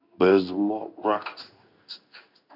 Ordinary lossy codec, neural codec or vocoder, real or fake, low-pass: none; codec, 16 kHz in and 24 kHz out, 1 kbps, XY-Tokenizer; fake; 5.4 kHz